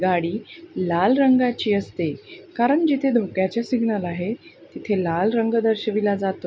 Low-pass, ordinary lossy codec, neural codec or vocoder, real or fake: none; none; none; real